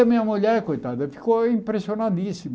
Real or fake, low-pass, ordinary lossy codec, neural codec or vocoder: real; none; none; none